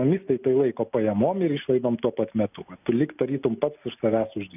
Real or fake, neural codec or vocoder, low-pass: real; none; 3.6 kHz